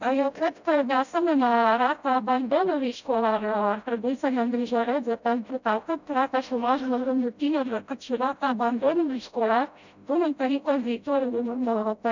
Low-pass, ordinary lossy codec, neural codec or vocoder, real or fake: 7.2 kHz; none; codec, 16 kHz, 0.5 kbps, FreqCodec, smaller model; fake